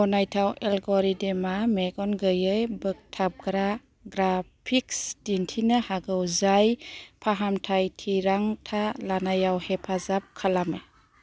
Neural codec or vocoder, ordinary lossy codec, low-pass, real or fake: none; none; none; real